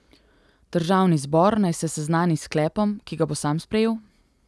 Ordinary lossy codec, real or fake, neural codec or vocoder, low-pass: none; real; none; none